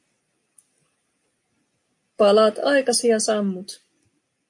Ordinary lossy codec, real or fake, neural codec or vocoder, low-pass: MP3, 48 kbps; real; none; 10.8 kHz